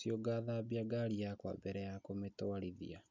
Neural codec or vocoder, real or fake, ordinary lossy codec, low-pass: none; real; none; 7.2 kHz